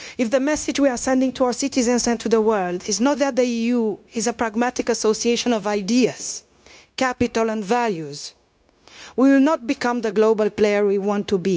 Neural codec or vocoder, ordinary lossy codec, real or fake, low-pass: codec, 16 kHz, 0.9 kbps, LongCat-Audio-Codec; none; fake; none